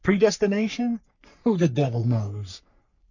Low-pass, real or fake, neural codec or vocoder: 7.2 kHz; fake; codec, 44.1 kHz, 3.4 kbps, Pupu-Codec